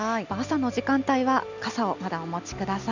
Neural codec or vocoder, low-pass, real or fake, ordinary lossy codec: none; 7.2 kHz; real; none